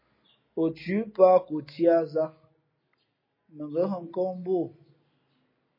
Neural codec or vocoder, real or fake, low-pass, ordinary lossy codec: none; real; 5.4 kHz; MP3, 24 kbps